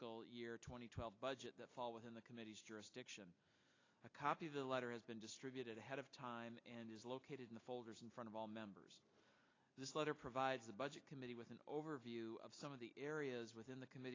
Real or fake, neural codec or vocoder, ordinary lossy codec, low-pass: real; none; AAC, 32 kbps; 7.2 kHz